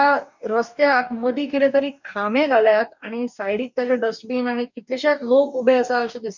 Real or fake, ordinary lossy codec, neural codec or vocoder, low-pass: fake; none; codec, 44.1 kHz, 2.6 kbps, DAC; 7.2 kHz